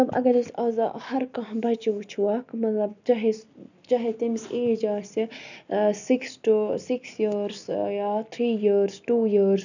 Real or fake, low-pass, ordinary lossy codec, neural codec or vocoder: real; 7.2 kHz; none; none